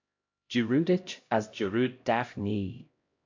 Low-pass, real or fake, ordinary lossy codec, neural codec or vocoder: 7.2 kHz; fake; AAC, 48 kbps; codec, 16 kHz, 0.5 kbps, X-Codec, HuBERT features, trained on LibriSpeech